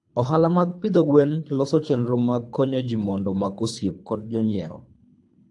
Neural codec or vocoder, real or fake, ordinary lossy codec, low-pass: codec, 24 kHz, 3 kbps, HILCodec; fake; AAC, 64 kbps; 10.8 kHz